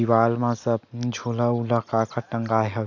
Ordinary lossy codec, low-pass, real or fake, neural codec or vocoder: none; 7.2 kHz; real; none